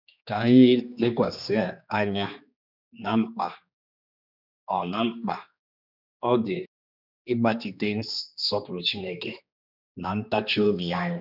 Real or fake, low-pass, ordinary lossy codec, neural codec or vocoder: fake; 5.4 kHz; none; codec, 16 kHz, 2 kbps, X-Codec, HuBERT features, trained on general audio